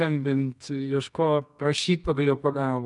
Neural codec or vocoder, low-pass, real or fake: codec, 24 kHz, 0.9 kbps, WavTokenizer, medium music audio release; 10.8 kHz; fake